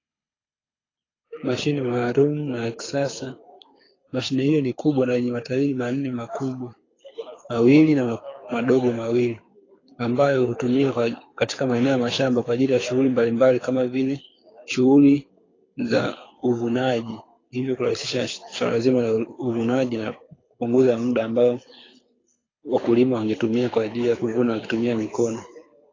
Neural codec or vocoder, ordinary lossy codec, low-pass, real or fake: codec, 24 kHz, 6 kbps, HILCodec; AAC, 32 kbps; 7.2 kHz; fake